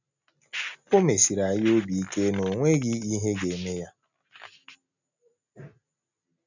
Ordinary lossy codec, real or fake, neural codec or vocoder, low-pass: none; real; none; 7.2 kHz